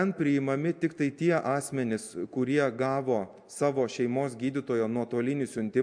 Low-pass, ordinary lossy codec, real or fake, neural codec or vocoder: 9.9 kHz; MP3, 64 kbps; real; none